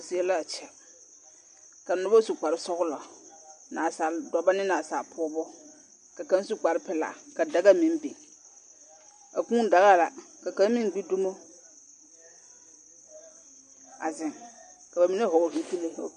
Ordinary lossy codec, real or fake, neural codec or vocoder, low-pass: MP3, 64 kbps; real; none; 10.8 kHz